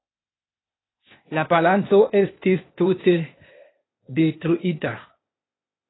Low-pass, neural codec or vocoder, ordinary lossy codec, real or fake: 7.2 kHz; codec, 16 kHz, 0.8 kbps, ZipCodec; AAC, 16 kbps; fake